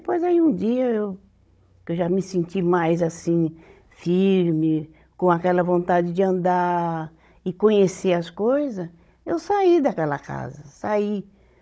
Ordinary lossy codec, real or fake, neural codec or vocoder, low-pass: none; fake; codec, 16 kHz, 16 kbps, FunCodec, trained on Chinese and English, 50 frames a second; none